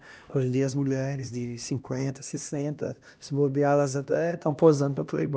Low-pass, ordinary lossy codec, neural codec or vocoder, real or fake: none; none; codec, 16 kHz, 1 kbps, X-Codec, HuBERT features, trained on LibriSpeech; fake